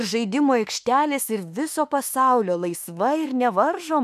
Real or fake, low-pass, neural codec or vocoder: fake; 14.4 kHz; autoencoder, 48 kHz, 32 numbers a frame, DAC-VAE, trained on Japanese speech